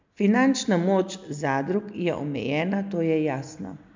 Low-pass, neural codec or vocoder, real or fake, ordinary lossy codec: 7.2 kHz; none; real; MP3, 64 kbps